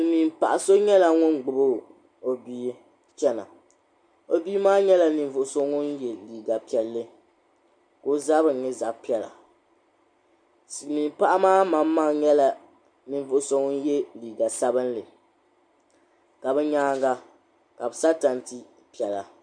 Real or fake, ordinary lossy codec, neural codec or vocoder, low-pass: real; MP3, 64 kbps; none; 9.9 kHz